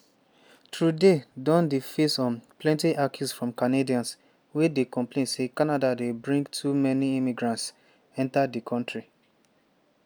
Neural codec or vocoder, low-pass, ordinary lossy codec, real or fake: none; none; none; real